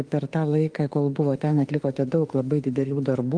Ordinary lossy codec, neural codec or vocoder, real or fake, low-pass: Opus, 24 kbps; autoencoder, 48 kHz, 32 numbers a frame, DAC-VAE, trained on Japanese speech; fake; 9.9 kHz